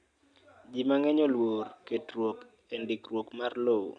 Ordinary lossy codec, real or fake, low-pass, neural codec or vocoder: none; real; 9.9 kHz; none